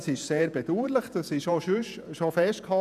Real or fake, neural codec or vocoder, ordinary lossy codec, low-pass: fake; vocoder, 48 kHz, 128 mel bands, Vocos; none; 14.4 kHz